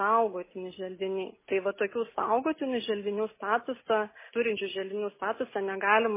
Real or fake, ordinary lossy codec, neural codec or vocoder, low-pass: real; MP3, 16 kbps; none; 3.6 kHz